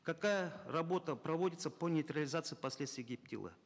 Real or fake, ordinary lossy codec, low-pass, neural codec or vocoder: real; none; none; none